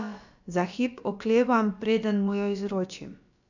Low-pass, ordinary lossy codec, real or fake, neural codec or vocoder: 7.2 kHz; none; fake; codec, 16 kHz, about 1 kbps, DyCAST, with the encoder's durations